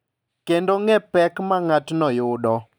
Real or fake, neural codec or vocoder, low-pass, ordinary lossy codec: real; none; none; none